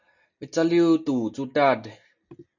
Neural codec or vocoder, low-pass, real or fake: none; 7.2 kHz; real